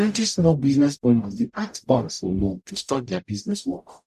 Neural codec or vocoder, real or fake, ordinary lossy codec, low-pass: codec, 44.1 kHz, 0.9 kbps, DAC; fake; none; 14.4 kHz